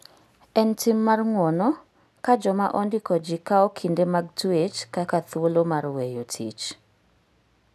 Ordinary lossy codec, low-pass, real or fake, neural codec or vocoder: none; 14.4 kHz; real; none